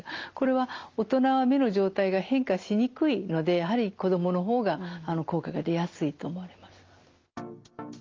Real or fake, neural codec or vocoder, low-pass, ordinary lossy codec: real; none; 7.2 kHz; Opus, 32 kbps